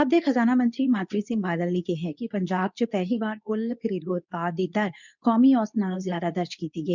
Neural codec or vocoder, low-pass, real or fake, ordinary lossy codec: codec, 24 kHz, 0.9 kbps, WavTokenizer, medium speech release version 2; 7.2 kHz; fake; none